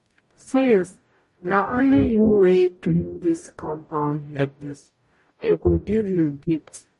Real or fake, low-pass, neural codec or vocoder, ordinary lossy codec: fake; 14.4 kHz; codec, 44.1 kHz, 0.9 kbps, DAC; MP3, 48 kbps